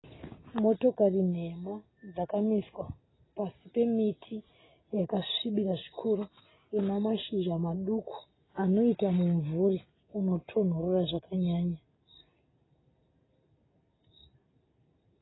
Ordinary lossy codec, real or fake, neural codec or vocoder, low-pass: AAC, 16 kbps; real; none; 7.2 kHz